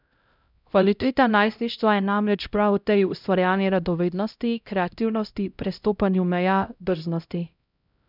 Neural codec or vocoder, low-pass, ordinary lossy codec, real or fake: codec, 16 kHz, 0.5 kbps, X-Codec, HuBERT features, trained on LibriSpeech; 5.4 kHz; none; fake